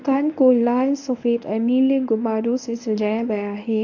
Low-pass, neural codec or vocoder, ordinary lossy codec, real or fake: 7.2 kHz; codec, 24 kHz, 0.9 kbps, WavTokenizer, medium speech release version 1; AAC, 48 kbps; fake